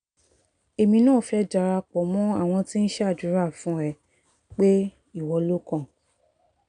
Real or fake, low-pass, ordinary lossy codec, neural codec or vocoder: real; 9.9 kHz; none; none